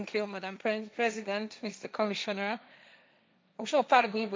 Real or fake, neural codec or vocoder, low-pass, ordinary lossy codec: fake; codec, 16 kHz, 1.1 kbps, Voila-Tokenizer; none; none